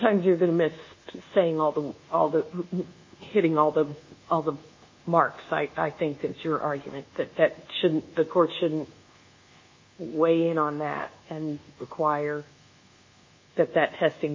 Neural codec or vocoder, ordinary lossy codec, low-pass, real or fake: codec, 24 kHz, 1.2 kbps, DualCodec; MP3, 32 kbps; 7.2 kHz; fake